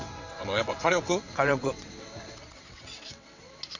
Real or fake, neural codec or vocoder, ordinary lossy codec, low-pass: fake; vocoder, 22.05 kHz, 80 mel bands, WaveNeXt; AAC, 48 kbps; 7.2 kHz